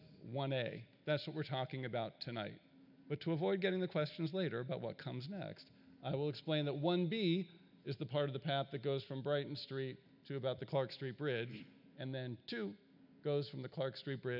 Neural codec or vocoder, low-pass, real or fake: none; 5.4 kHz; real